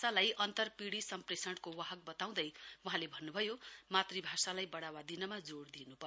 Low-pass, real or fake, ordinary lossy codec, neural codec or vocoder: none; real; none; none